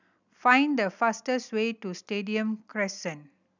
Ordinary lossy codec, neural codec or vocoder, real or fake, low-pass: none; none; real; 7.2 kHz